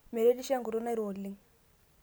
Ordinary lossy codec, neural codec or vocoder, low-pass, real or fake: none; none; none; real